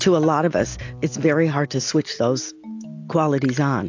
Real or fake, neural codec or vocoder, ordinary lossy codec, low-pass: real; none; AAC, 48 kbps; 7.2 kHz